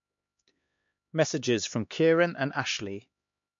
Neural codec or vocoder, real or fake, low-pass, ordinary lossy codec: codec, 16 kHz, 2 kbps, X-Codec, HuBERT features, trained on LibriSpeech; fake; 7.2 kHz; MP3, 48 kbps